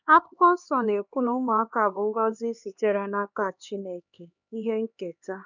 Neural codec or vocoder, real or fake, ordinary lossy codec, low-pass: codec, 16 kHz, 2 kbps, X-Codec, HuBERT features, trained on LibriSpeech; fake; none; 7.2 kHz